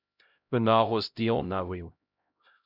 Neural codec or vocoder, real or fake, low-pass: codec, 16 kHz, 0.5 kbps, X-Codec, HuBERT features, trained on LibriSpeech; fake; 5.4 kHz